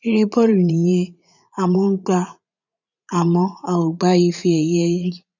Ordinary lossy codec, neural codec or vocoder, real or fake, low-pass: none; vocoder, 44.1 kHz, 80 mel bands, Vocos; fake; 7.2 kHz